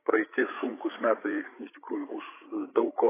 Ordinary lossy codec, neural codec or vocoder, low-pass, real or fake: AAC, 16 kbps; codec, 16 kHz, 8 kbps, FreqCodec, larger model; 3.6 kHz; fake